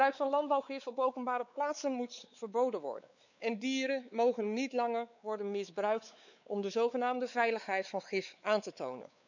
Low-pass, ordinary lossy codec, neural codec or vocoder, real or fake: 7.2 kHz; none; codec, 16 kHz, 4 kbps, X-Codec, WavLM features, trained on Multilingual LibriSpeech; fake